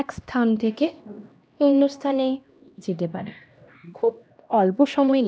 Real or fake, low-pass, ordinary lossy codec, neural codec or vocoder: fake; none; none; codec, 16 kHz, 1 kbps, X-Codec, HuBERT features, trained on LibriSpeech